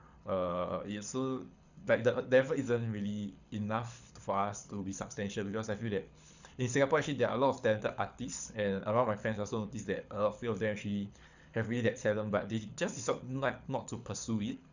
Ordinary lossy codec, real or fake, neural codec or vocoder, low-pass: none; fake; codec, 24 kHz, 6 kbps, HILCodec; 7.2 kHz